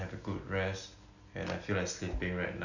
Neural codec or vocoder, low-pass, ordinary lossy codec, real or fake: none; 7.2 kHz; none; real